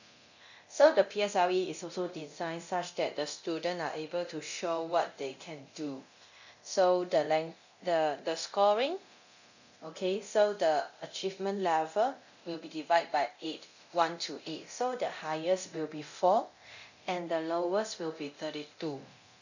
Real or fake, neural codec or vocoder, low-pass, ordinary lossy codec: fake; codec, 24 kHz, 0.9 kbps, DualCodec; 7.2 kHz; none